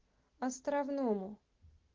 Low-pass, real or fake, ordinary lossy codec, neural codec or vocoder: 7.2 kHz; real; Opus, 16 kbps; none